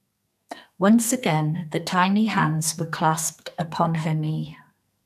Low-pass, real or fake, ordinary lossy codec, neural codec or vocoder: 14.4 kHz; fake; none; codec, 44.1 kHz, 2.6 kbps, SNAC